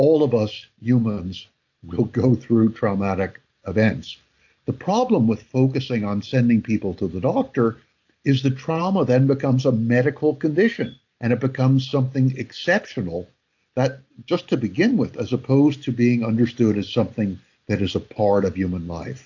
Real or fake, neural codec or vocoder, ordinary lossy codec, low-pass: real; none; AAC, 48 kbps; 7.2 kHz